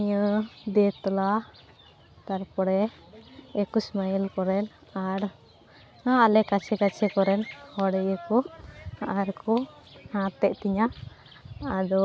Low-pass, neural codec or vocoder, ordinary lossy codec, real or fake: none; none; none; real